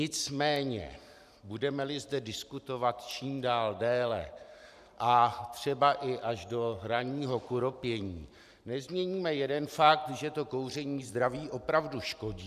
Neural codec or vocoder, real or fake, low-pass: vocoder, 44.1 kHz, 128 mel bands every 512 samples, BigVGAN v2; fake; 14.4 kHz